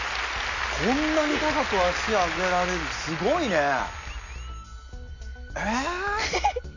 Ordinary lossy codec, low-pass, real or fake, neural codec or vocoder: AAC, 48 kbps; 7.2 kHz; real; none